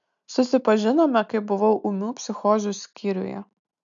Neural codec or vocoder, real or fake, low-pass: none; real; 7.2 kHz